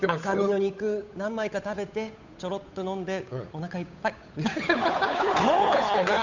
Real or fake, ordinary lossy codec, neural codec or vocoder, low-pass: fake; none; codec, 16 kHz, 8 kbps, FunCodec, trained on Chinese and English, 25 frames a second; 7.2 kHz